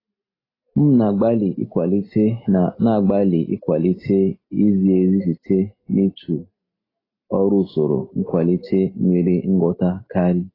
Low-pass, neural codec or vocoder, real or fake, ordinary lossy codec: 5.4 kHz; none; real; AAC, 24 kbps